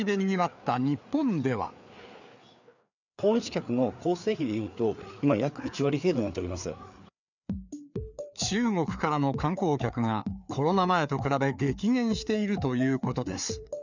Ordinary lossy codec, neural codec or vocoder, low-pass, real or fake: none; codec, 16 kHz, 4 kbps, FreqCodec, larger model; 7.2 kHz; fake